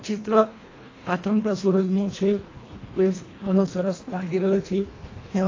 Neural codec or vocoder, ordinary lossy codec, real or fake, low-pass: codec, 24 kHz, 1.5 kbps, HILCodec; AAC, 32 kbps; fake; 7.2 kHz